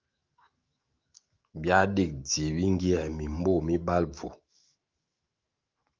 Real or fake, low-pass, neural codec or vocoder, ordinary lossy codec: fake; 7.2 kHz; autoencoder, 48 kHz, 128 numbers a frame, DAC-VAE, trained on Japanese speech; Opus, 24 kbps